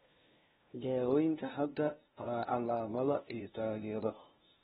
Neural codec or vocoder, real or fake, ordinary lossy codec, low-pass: codec, 16 kHz, 0.5 kbps, FunCodec, trained on LibriTTS, 25 frames a second; fake; AAC, 16 kbps; 7.2 kHz